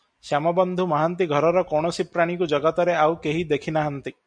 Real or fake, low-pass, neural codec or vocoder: real; 9.9 kHz; none